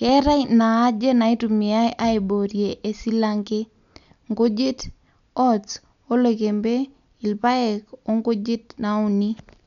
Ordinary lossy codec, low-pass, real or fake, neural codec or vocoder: none; 7.2 kHz; real; none